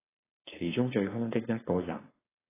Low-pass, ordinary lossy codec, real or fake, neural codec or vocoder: 3.6 kHz; AAC, 16 kbps; fake; codec, 16 kHz, 4.8 kbps, FACodec